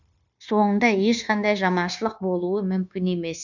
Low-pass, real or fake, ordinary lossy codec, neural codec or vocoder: 7.2 kHz; fake; none; codec, 16 kHz, 0.9 kbps, LongCat-Audio-Codec